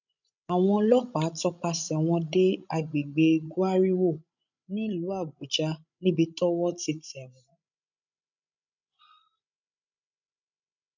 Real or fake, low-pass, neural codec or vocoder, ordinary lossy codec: fake; 7.2 kHz; codec, 16 kHz, 16 kbps, FreqCodec, larger model; none